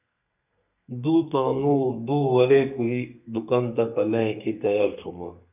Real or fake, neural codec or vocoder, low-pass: fake; codec, 32 kHz, 1.9 kbps, SNAC; 3.6 kHz